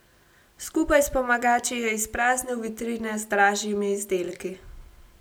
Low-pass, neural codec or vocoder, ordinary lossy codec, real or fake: none; none; none; real